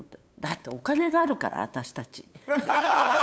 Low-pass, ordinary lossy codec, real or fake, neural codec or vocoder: none; none; fake; codec, 16 kHz, 8 kbps, FunCodec, trained on LibriTTS, 25 frames a second